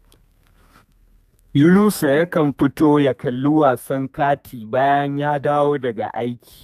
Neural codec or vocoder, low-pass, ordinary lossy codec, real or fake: codec, 32 kHz, 1.9 kbps, SNAC; 14.4 kHz; none; fake